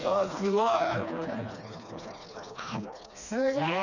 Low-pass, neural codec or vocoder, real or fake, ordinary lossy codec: 7.2 kHz; codec, 16 kHz, 2 kbps, FreqCodec, smaller model; fake; none